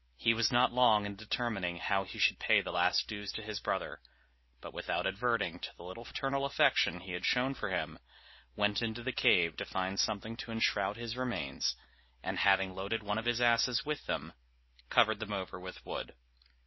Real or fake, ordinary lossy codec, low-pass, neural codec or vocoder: real; MP3, 24 kbps; 7.2 kHz; none